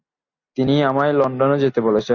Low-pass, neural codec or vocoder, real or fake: 7.2 kHz; none; real